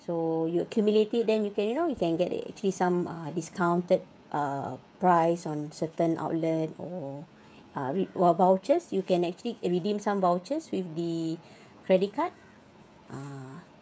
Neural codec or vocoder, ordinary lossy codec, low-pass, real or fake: codec, 16 kHz, 16 kbps, FreqCodec, smaller model; none; none; fake